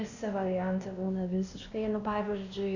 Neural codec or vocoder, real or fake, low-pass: codec, 16 kHz, 1 kbps, X-Codec, WavLM features, trained on Multilingual LibriSpeech; fake; 7.2 kHz